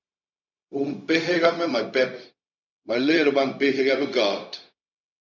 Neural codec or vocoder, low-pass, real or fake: codec, 16 kHz, 0.4 kbps, LongCat-Audio-Codec; 7.2 kHz; fake